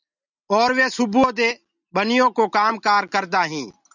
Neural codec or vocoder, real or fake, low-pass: none; real; 7.2 kHz